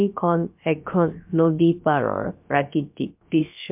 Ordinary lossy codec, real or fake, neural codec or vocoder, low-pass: MP3, 32 kbps; fake; codec, 16 kHz, about 1 kbps, DyCAST, with the encoder's durations; 3.6 kHz